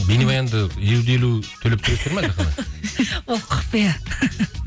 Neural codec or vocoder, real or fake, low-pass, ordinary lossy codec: none; real; none; none